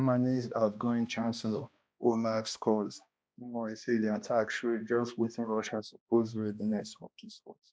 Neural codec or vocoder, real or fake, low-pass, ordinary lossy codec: codec, 16 kHz, 1 kbps, X-Codec, HuBERT features, trained on balanced general audio; fake; none; none